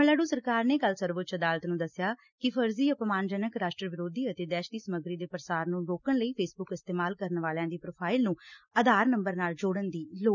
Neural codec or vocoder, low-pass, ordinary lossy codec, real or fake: none; 7.2 kHz; none; real